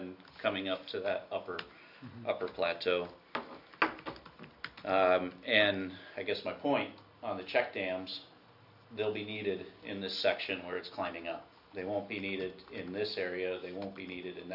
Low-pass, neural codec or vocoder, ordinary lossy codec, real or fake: 5.4 kHz; none; AAC, 48 kbps; real